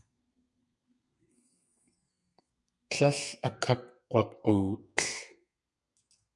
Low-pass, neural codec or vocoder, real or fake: 10.8 kHz; codec, 32 kHz, 1.9 kbps, SNAC; fake